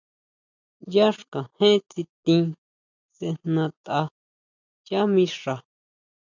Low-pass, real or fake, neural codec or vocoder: 7.2 kHz; real; none